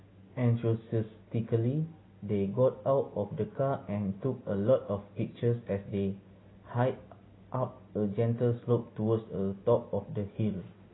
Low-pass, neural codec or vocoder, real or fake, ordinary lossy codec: 7.2 kHz; none; real; AAC, 16 kbps